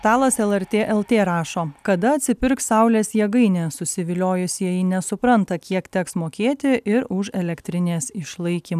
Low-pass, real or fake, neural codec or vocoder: 14.4 kHz; real; none